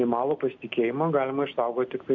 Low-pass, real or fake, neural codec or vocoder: 7.2 kHz; real; none